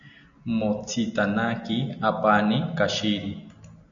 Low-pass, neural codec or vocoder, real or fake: 7.2 kHz; none; real